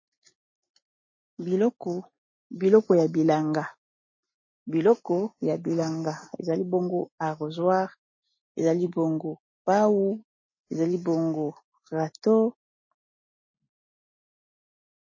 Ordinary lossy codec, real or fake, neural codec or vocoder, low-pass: MP3, 32 kbps; real; none; 7.2 kHz